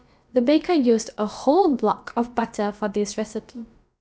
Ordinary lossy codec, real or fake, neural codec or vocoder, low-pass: none; fake; codec, 16 kHz, about 1 kbps, DyCAST, with the encoder's durations; none